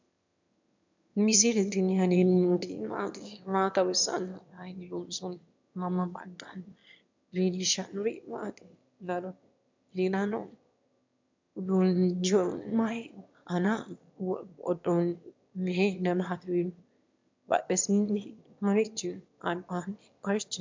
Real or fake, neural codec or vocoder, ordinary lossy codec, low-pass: fake; autoencoder, 22.05 kHz, a latent of 192 numbers a frame, VITS, trained on one speaker; MP3, 64 kbps; 7.2 kHz